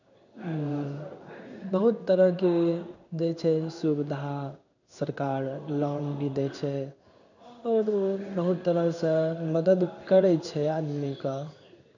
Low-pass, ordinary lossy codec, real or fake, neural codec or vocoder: 7.2 kHz; none; fake; codec, 16 kHz in and 24 kHz out, 1 kbps, XY-Tokenizer